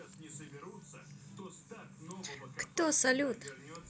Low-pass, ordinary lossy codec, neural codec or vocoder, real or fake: none; none; none; real